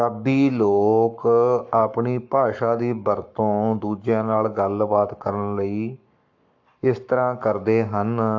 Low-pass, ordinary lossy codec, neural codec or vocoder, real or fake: 7.2 kHz; AAC, 48 kbps; codec, 44.1 kHz, 7.8 kbps, Pupu-Codec; fake